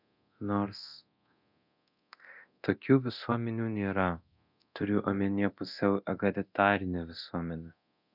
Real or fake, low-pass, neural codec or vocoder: fake; 5.4 kHz; codec, 24 kHz, 0.9 kbps, DualCodec